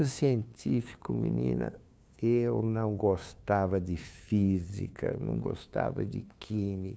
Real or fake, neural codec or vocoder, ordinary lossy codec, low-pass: fake; codec, 16 kHz, 2 kbps, FunCodec, trained on LibriTTS, 25 frames a second; none; none